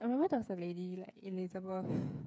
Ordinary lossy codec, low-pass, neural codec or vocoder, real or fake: none; none; codec, 16 kHz, 8 kbps, FreqCodec, smaller model; fake